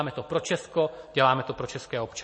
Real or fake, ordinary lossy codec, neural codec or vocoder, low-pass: real; MP3, 32 kbps; none; 9.9 kHz